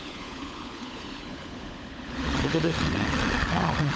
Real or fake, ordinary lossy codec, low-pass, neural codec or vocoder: fake; none; none; codec, 16 kHz, 16 kbps, FunCodec, trained on LibriTTS, 50 frames a second